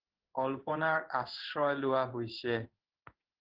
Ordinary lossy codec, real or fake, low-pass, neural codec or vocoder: Opus, 16 kbps; fake; 5.4 kHz; codec, 16 kHz in and 24 kHz out, 1 kbps, XY-Tokenizer